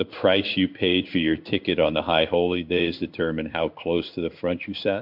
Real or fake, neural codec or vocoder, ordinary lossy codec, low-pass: fake; codec, 16 kHz in and 24 kHz out, 1 kbps, XY-Tokenizer; MP3, 48 kbps; 5.4 kHz